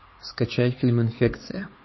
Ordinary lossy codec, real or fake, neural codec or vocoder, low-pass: MP3, 24 kbps; fake; codec, 16 kHz, 4 kbps, X-Codec, HuBERT features, trained on LibriSpeech; 7.2 kHz